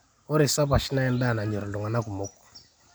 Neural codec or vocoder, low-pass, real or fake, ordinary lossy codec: none; none; real; none